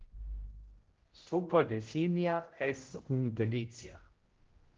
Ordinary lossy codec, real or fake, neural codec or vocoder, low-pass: Opus, 16 kbps; fake; codec, 16 kHz, 0.5 kbps, X-Codec, HuBERT features, trained on general audio; 7.2 kHz